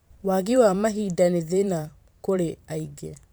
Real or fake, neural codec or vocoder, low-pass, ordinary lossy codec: fake; vocoder, 44.1 kHz, 128 mel bands every 512 samples, BigVGAN v2; none; none